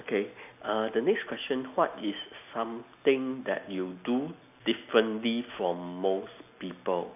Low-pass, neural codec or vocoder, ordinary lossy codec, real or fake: 3.6 kHz; none; none; real